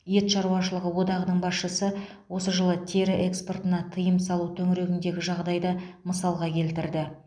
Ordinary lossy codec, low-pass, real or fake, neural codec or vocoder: none; 9.9 kHz; real; none